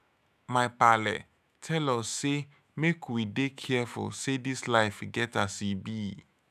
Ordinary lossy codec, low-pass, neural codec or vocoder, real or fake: none; 14.4 kHz; autoencoder, 48 kHz, 128 numbers a frame, DAC-VAE, trained on Japanese speech; fake